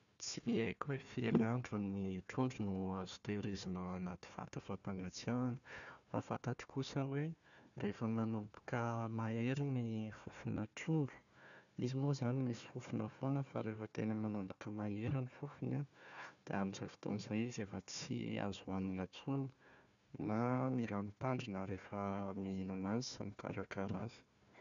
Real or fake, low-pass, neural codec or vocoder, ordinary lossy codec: fake; 7.2 kHz; codec, 16 kHz, 1 kbps, FunCodec, trained on Chinese and English, 50 frames a second; none